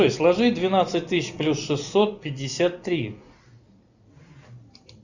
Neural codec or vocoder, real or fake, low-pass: none; real; 7.2 kHz